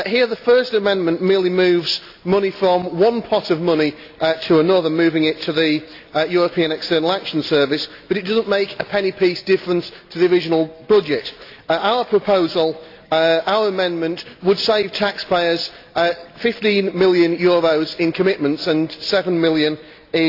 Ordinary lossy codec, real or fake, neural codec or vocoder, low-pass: AAC, 32 kbps; real; none; 5.4 kHz